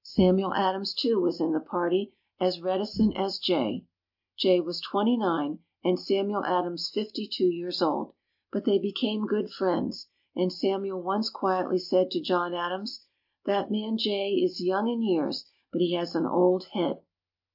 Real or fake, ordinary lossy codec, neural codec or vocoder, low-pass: real; AAC, 48 kbps; none; 5.4 kHz